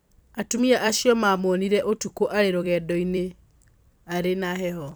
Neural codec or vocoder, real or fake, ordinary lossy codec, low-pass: vocoder, 44.1 kHz, 128 mel bands every 256 samples, BigVGAN v2; fake; none; none